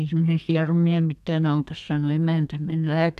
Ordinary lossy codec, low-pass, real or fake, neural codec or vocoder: none; 14.4 kHz; fake; codec, 32 kHz, 1.9 kbps, SNAC